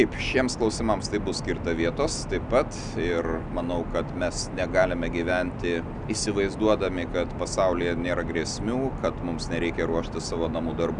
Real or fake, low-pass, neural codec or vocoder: real; 9.9 kHz; none